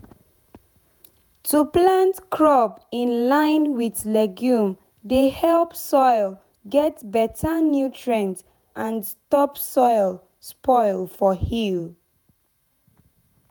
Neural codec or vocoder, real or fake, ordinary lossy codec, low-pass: vocoder, 48 kHz, 128 mel bands, Vocos; fake; none; none